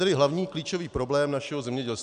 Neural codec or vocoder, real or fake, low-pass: none; real; 9.9 kHz